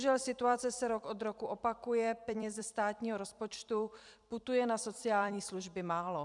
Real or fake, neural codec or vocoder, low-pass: fake; vocoder, 44.1 kHz, 128 mel bands every 256 samples, BigVGAN v2; 10.8 kHz